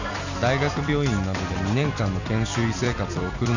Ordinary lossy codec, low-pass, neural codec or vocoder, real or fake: none; 7.2 kHz; vocoder, 44.1 kHz, 128 mel bands every 256 samples, BigVGAN v2; fake